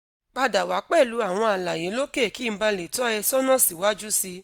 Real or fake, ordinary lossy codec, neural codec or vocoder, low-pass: real; none; none; none